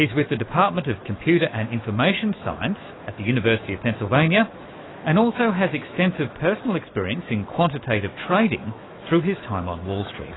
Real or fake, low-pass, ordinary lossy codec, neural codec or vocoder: fake; 7.2 kHz; AAC, 16 kbps; autoencoder, 48 kHz, 128 numbers a frame, DAC-VAE, trained on Japanese speech